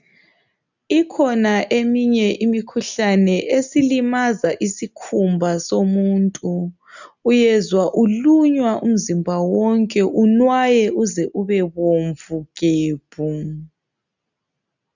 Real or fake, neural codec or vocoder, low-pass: real; none; 7.2 kHz